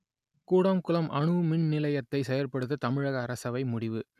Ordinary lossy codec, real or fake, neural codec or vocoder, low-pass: none; real; none; 14.4 kHz